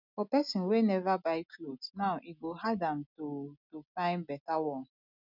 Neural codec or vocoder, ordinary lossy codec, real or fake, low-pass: none; none; real; 5.4 kHz